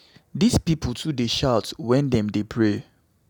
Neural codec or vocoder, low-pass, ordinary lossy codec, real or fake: none; 19.8 kHz; none; real